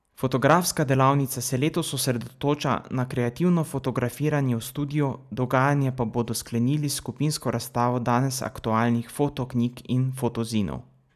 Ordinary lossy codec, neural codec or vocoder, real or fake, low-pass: none; none; real; 14.4 kHz